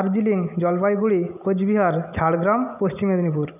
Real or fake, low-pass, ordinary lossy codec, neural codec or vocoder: real; 3.6 kHz; none; none